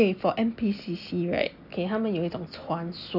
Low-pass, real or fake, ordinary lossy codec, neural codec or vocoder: 5.4 kHz; real; none; none